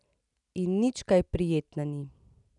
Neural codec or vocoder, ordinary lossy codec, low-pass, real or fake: none; none; 10.8 kHz; real